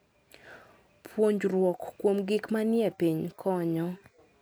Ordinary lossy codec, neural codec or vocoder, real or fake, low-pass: none; none; real; none